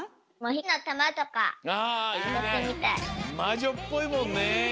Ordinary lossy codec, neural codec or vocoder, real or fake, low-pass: none; none; real; none